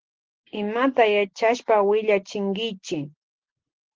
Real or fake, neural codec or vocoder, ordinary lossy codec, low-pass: real; none; Opus, 16 kbps; 7.2 kHz